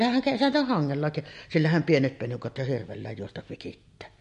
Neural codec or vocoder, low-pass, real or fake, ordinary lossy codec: none; 14.4 kHz; real; MP3, 48 kbps